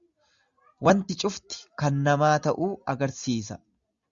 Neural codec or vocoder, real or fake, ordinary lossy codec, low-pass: none; real; Opus, 64 kbps; 7.2 kHz